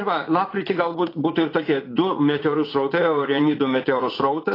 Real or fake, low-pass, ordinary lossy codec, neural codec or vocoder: fake; 5.4 kHz; AAC, 24 kbps; vocoder, 44.1 kHz, 80 mel bands, Vocos